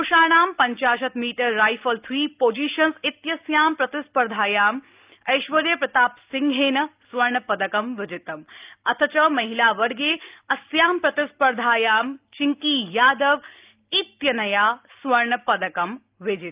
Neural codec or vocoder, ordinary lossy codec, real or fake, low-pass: none; Opus, 24 kbps; real; 3.6 kHz